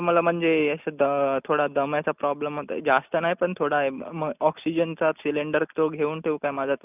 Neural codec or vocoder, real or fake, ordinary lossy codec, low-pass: none; real; none; 3.6 kHz